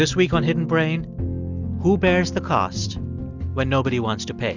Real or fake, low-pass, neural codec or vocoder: real; 7.2 kHz; none